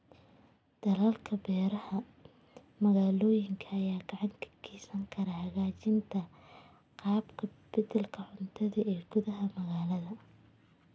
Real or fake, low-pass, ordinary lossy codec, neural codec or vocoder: real; none; none; none